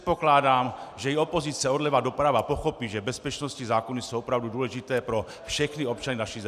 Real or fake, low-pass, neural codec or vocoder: real; 14.4 kHz; none